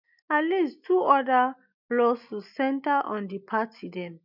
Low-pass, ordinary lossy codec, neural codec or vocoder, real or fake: 5.4 kHz; none; none; real